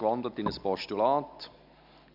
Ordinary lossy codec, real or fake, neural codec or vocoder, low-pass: none; real; none; 5.4 kHz